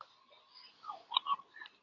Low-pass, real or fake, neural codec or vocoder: 7.2 kHz; fake; codec, 24 kHz, 0.9 kbps, WavTokenizer, medium speech release version 2